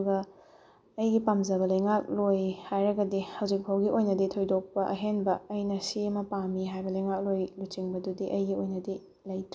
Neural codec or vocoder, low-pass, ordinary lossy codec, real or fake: none; none; none; real